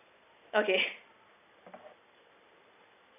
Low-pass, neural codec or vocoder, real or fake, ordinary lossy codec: 3.6 kHz; none; real; none